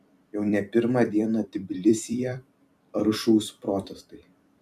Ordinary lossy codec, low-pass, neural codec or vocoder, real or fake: MP3, 96 kbps; 14.4 kHz; vocoder, 44.1 kHz, 128 mel bands every 256 samples, BigVGAN v2; fake